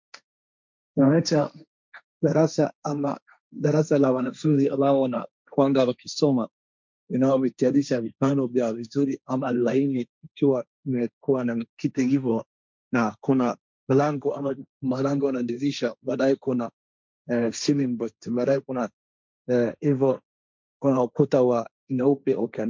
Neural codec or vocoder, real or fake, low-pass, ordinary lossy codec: codec, 16 kHz, 1.1 kbps, Voila-Tokenizer; fake; 7.2 kHz; MP3, 64 kbps